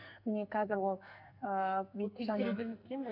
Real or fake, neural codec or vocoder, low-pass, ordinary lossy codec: fake; codec, 44.1 kHz, 2.6 kbps, SNAC; 5.4 kHz; none